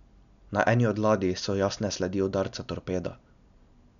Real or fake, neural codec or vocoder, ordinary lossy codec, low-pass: real; none; none; 7.2 kHz